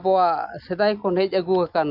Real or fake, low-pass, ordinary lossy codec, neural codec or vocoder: real; 5.4 kHz; none; none